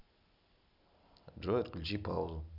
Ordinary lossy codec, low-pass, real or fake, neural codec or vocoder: none; 5.4 kHz; real; none